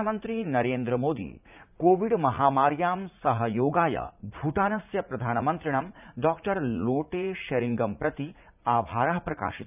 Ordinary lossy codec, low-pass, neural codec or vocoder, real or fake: none; 3.6 kHz; vocoder, 44.1 kHz, 80 mel bands, Vocos; fake